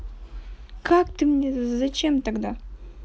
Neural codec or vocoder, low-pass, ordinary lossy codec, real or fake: none; none; none; real